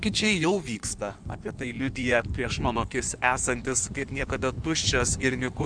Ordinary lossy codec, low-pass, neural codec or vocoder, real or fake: MP3, 96 kbps; 9.9 kHz; codec, 16 kHz in and 24 kHz out, 1.1 kbps, FireRedTTS-2 codec; fake